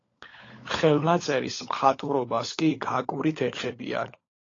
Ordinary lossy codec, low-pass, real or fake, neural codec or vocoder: AAC, 32 kbps; 7.2 kHz; fake; codec, 16 kHz, 16 kbps, FunCodec, trained on LibriTTS, 50 frames a second